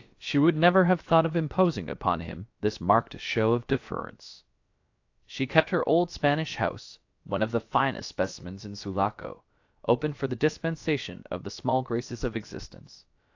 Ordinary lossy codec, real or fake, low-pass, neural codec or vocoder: AAC, 48 kbps; fake; 7.2 kHz; codec, 16 kHz, about 1 kbps, DyCAST, with the encoder's durations